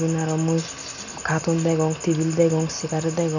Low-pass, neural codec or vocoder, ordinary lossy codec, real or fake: 7.2 kHz; none; none; real